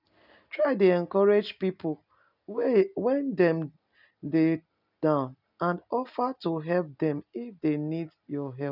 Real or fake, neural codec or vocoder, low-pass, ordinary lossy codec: real; none; 5.4 kHz; none